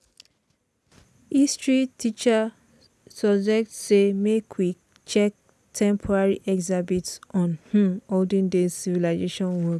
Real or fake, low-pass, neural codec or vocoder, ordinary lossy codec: real; none; none; none